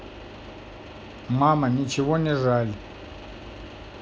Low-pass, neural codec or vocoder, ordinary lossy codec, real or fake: none; none; none; real